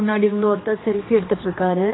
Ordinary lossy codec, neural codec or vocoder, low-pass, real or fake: AAC, 16 kbps; codec, 16 kHz, 2 kbps, X-Codec, HuBERT features, trained on general audio; 7.2 kHz; fake